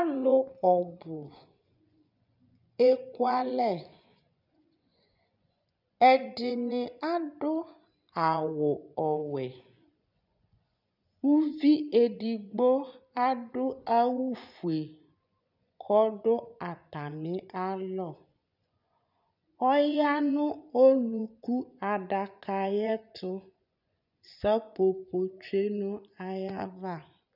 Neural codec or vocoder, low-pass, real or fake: vocoder, 24 kHz, 100 mel bands, Vocos; 5.4 kHz; fake